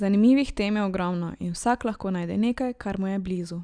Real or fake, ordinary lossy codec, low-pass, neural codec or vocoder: real; Opus, 64 kbps; 9.9 kHz; none